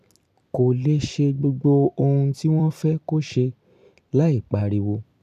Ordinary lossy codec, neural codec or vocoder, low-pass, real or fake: none; vocoder, 44.1 kHz, 128 mel bands every 256 samples, BigVGAN v2; 14.4 kHz; fake